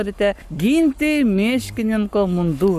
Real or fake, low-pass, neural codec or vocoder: fake; 14.4 kHz; codec, 44.1 kHz, 7.8 kbps, Pupu-Codec